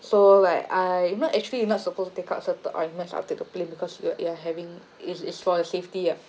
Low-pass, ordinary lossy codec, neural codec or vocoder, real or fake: none; none; none; real